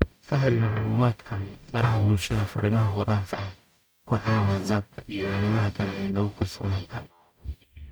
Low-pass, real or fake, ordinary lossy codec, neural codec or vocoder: none; fake; none; codec, 44.1 kHz, 0.9 kbps, DAC